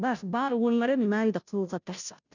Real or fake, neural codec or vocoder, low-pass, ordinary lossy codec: fake; codec, 16 kHz, 0.5 kbps, FunCodec, trained on Chinese and English, 25 frames a second; 7.2 kHz; none